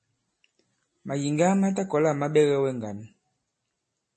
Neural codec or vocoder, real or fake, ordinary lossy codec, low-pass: none; real; MP3, 32 kbps; 10.8 kHz